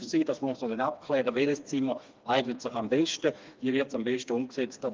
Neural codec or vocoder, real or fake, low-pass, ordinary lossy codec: codec, 16 kHz, 2 kbps, FreqCodec, smaller model; fake; 7.2 kHz; Opus, 32 kbps